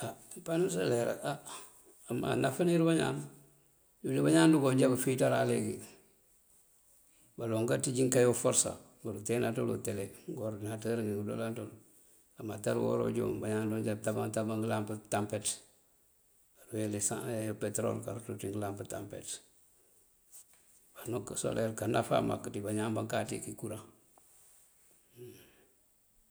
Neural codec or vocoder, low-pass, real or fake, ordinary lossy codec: none; none; real; none